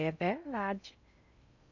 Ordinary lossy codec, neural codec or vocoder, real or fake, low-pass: none; codec, 16 kHz in and 24 kHz out, 0.6 kbps, FocalCodec, streaming, 4096 codes; fake; 7.2 kHz